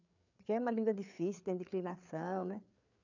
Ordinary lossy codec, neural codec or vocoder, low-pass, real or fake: none; codec, 16 kHz, 4 kbps, FreqCodec, larger model; 7.2 kHz; fake